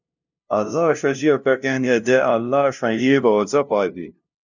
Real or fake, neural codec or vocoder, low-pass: fake; codec, 16 kHz, 0.5 kbps, FunCodec, trained on LibriTTS, 25 frames a second; 7.2 kHz